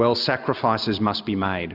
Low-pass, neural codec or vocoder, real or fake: 5.4 kHz; none; real